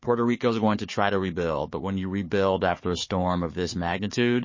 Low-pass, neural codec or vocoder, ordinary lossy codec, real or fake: 7.2 kHz; codec, 16 kHz, 4 kbps, FunCodec, trained on Chinese and English, 50 frames a second; MP3, 32 kbps; fake